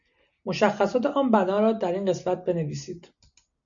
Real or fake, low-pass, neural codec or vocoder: real; 7.2 kHz; none